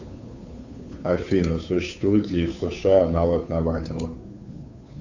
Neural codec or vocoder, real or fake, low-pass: codec, 16 kHz, 2 kbps, FunCodec, trained on Chinese and English, 25 frames a second; fake; 7.2 kHz